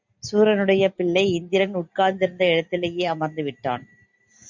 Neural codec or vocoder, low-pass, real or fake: none; 7.2 kHz; real